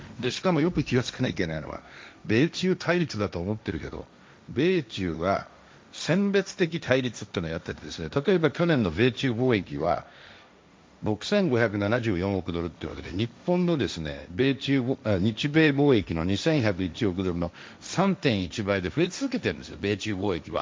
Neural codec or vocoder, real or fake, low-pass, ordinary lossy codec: codec, 16 kHz, 1.1 kbps, Voila-Tokenizer; fake; none; none